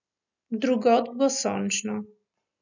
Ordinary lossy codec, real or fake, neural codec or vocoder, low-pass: none; real; none; 7.2 kHz